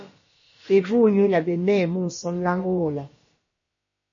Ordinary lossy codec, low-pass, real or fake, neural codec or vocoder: MP3, 32 kbps; 7.2 kHz; fake; codec, 16 kHz, about 1 kbps, DyCAST, with the encoder's durations